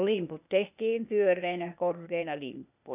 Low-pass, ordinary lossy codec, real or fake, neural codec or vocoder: 3.6 kHz; none; fake; codec, 16 kHz, 0.8 kbps, ZipCodec